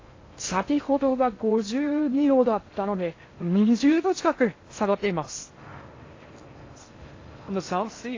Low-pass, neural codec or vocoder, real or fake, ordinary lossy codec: 7.2 kHz; codec, 16 kHz in and 24 kHz out, 0.6 kbps, FocalCodec, streaming, 2048 codes; fake; AAC, 32 kbps